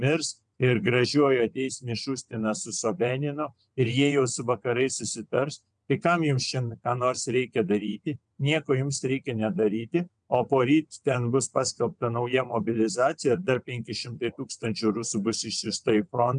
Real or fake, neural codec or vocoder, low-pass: fake; vocoder, 22.05 kHz, 80 mel bands, WaveNeXt; 9.9 kHz